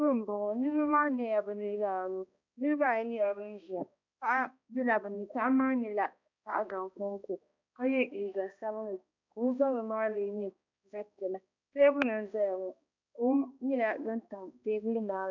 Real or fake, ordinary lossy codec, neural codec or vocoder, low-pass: fake; MP3, 64 kbps; codec, 16 kHz, 1 kbps, X-Codec, HuBERT features, trained on balanced general audio; 7.2 kHz